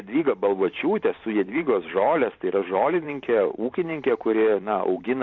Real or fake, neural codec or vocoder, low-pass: fake; vocoder, 44.1 kHz, 128 mel bands every 512 samples, BigVGAN v2; 7.2 kHz